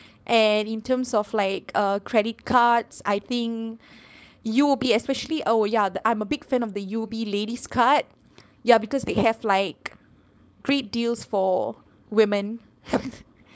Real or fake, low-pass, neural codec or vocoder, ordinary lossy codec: fake; none; codec, 16 kHz, 4.8 kbps, FACodec; none